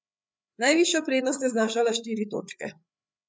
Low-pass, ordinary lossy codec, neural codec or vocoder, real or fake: none; none; codec, 16 kHz, 8 kbps, FreqCodec, larger model; fake